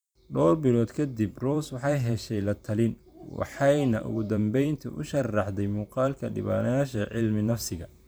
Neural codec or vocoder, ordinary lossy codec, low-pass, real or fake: vocoder, 44.1 kHz, 128 mel bands every 512 samples, BigVGAN v2; none; none; fake